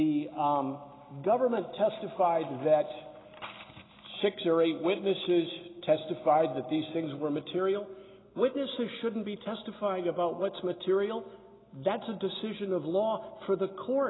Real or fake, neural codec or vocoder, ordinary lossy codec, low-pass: real; none; AAC, 16 kbps; 7.2 kHz